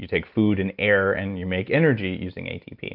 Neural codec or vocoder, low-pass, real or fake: none; 5.4 kHz; real